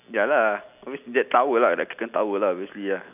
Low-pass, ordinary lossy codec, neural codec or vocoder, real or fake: 3.6 kHz; none; none; real